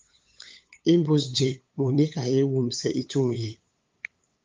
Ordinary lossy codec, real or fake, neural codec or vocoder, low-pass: Opus, 32 kbps; fake; codec, 16 kHz, 8 kbps, FunCodec, trained on LibriTTS, 25 frames a second; 7.2 kHz